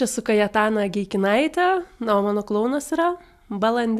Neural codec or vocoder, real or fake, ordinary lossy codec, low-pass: none; real; AAC, 96 kbps; 14.4 kHz